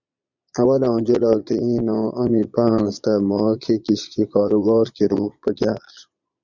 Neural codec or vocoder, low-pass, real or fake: vocoder, 22.05 kHz, 80 mel bands, Vocos; 7.2 kHz; fake